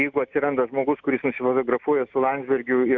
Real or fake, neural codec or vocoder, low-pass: real; none; 7.2 kHz